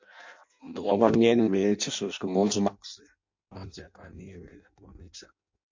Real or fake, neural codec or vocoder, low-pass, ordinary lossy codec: fake; codec, 16 kHz in and 24 kHz out, 0.6 kbps, FireRedTTS-2 codec; 7.2 kHz; MP3, 48 kbps